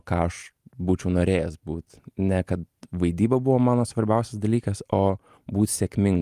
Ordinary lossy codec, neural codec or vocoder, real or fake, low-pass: Opus, 32 kbps; none; real; 14.4 kHz